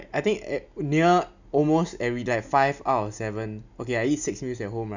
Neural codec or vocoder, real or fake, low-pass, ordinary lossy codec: none; real; 7.2 kHz; none